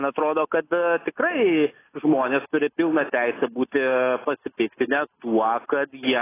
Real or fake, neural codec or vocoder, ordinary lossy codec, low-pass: real; none; AAC, 16 kbps; 3.6 kHz